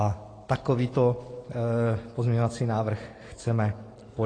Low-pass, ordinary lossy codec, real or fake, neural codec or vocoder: 9.9 kHz; AAC, 32 kbps; real; none